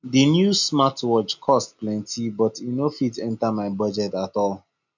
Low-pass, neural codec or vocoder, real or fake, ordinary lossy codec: 7.2 kHz; none; real; none